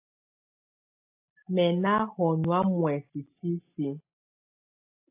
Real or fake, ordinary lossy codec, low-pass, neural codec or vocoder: real; AAC, 32 kbps; 3.6 kHz; none